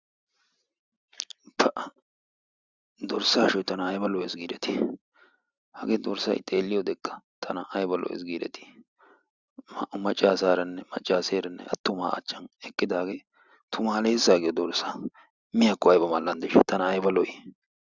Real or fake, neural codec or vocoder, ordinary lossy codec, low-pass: real; none; Opus, 64 kbps; 7.2 kHz